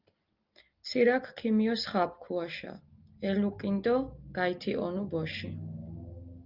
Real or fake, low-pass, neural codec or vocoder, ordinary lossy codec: real; 5.4 kHz; none; Opus, 32 kbps